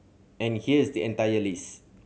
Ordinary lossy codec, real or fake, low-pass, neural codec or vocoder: none; real; none; none